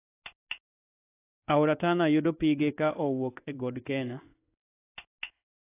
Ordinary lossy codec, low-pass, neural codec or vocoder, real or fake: AAC, 24 kbps; 3.6 kHz; codec, 16 kHz in and 24 kHz out, 1 kbps, XY-Tokenizer; fake